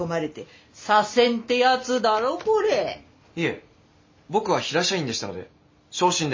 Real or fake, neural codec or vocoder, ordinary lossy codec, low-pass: real; none; MP3, 32 kbps; 7.2 kHz